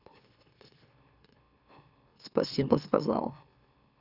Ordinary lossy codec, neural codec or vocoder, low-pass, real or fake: Opus, 64 kbps; autoencoder, 44.1 kHz, a latent of 192 numbers a frame, MeloTTS; 5.4 kHz; fake